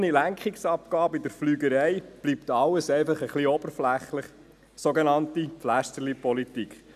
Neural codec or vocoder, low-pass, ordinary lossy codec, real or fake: none; 14.4 kHz; none; real